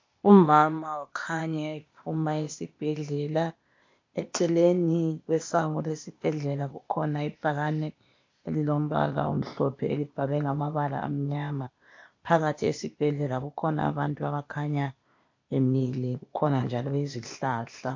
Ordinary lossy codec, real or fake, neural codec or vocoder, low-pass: MP3, 48 kbps; fake; codec, 16 kHz, 0.8 kbps, ZipCodec; 7.2 kHz